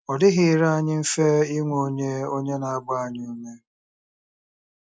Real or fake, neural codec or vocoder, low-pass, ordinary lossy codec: real; none; none; none